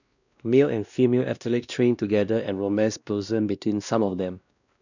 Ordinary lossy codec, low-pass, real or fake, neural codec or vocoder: none; 7.2 kHz; fake; codec, 16 kHz, 1 kbps, X-Codec, WavLM features, trained on Multilingual LibriSpeech